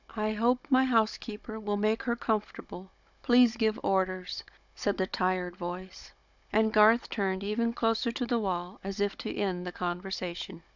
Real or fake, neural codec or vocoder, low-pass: fake; codec, 16 kHz, 16 kbps, FunCodec, trained on Chinese and English, 50 frames a second; 7.2 kHz